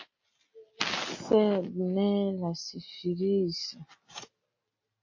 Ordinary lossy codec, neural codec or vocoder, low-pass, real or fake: MP3, 32 kbps; none; 7.2 kHz; real